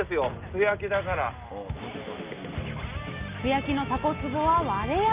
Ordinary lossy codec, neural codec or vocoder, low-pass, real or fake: Opus, 24 kbps; none; 3.6 kHz; real